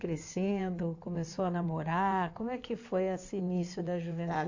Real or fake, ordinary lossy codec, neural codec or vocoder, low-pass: fake; AAC, 48 kbps; codec, 16 kHz in and 24 kHz out, 2.2 kbps, FireRedTTS-2 codec; 7.2 kHz